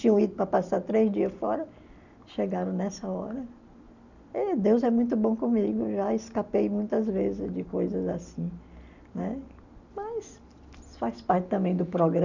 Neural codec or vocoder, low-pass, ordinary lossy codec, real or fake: none; 7.2 kHz; none; real